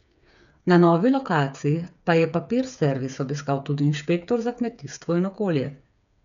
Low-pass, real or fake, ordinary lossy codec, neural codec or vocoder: 7.2 kHz; fake; none; codec, 16 kHz, 8 kbps, FreqCodec, smaller model